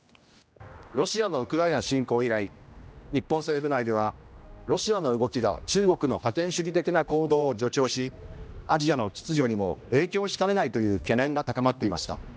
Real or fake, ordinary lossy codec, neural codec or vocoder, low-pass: fake; none; codec, 16 kHz, 1 kbps, X-Codec, HuBERT features, trained on general audio; none